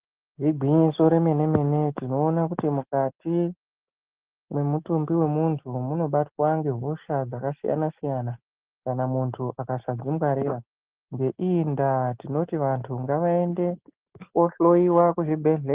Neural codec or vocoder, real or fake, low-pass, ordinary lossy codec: none; real; 3.6 kHz; Opus, 16 kbps